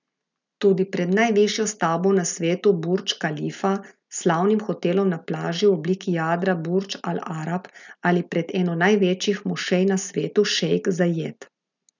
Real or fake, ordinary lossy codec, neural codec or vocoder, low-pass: real; none; none; 7.2 kHz